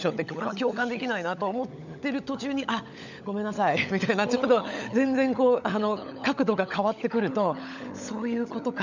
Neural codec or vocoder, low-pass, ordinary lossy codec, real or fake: codec, 16 kHz, 16 kbps, FunCodec, trained on LibriTTS, 50 frames a second; 7.2 kHz; none; fake